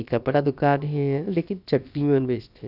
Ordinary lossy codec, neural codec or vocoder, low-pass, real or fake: none; codec, 16 kHz, about 1 kbps, DyCAST, with the encoder's durations; 5.4 kHz; fake